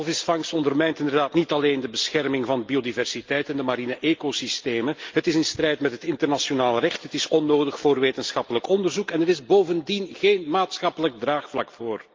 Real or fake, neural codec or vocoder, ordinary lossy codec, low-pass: real; none; Opus, 24 kbps; 7.2 kHz